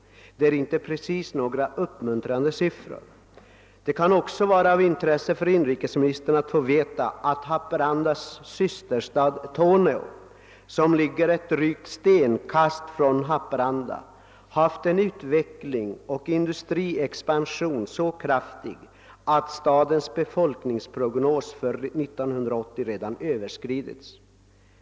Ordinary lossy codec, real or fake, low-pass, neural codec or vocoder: none; real; none; none